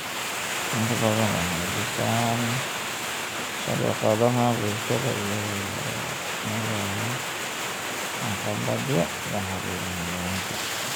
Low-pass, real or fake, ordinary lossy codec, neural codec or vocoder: none; real; none; none